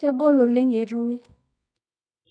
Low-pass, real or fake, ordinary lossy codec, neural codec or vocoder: 9.9 kHz; fake; none; codec, 24 kHz, 0.9 kbps, WavTokenizer, medium music audio release